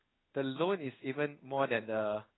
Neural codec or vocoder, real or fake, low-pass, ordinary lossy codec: codec, 16 kHz in and 24 kHz out, 1 kbps, XY-Tokenizer; fake; 7.2 kHz; AAC, 16 kbps